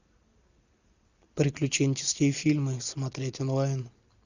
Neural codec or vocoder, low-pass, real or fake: none; 7.2 kHz; real